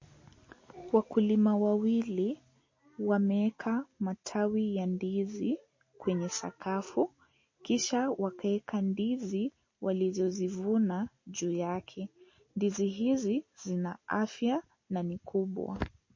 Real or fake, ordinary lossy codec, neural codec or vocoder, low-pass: real; MP3, 32 kbps; none; 7.2 kHz